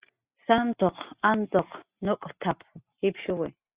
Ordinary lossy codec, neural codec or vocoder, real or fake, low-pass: Opus, 64 kbps; none; real; 3.6 kHz